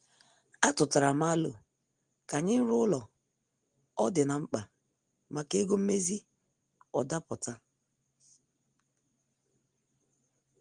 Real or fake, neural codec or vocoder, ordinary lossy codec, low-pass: real; none; Opus, 24 kbps; 9.9 kHz